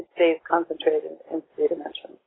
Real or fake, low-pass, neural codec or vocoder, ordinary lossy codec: real; 7.2 kHz; none; AAC, 16 kbps